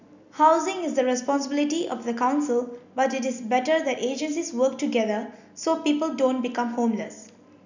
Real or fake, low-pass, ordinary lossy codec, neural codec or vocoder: real; 7.2 kHz; none; none